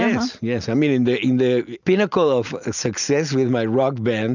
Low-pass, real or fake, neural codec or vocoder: 7.2 kHz; real; none